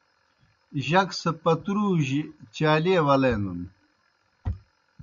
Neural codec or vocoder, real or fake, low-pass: none; real; 7.2 kHz